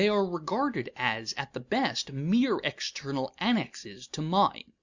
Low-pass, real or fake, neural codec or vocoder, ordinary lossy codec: 7.2 kHz; real; none; Opus, 64 kbps